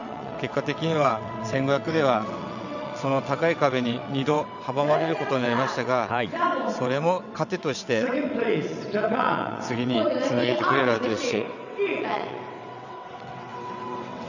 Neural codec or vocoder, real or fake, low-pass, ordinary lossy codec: vocoder, 22.05 kHz, 80 mel bands, WaveNeXt; fake; 7.2 kHz; none